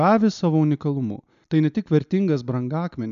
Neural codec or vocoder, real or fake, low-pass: none; real; 7.2 kHz